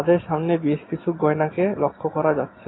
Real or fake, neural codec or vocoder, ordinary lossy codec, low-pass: real; none; AAC, 16 kbps; 7.2 kHz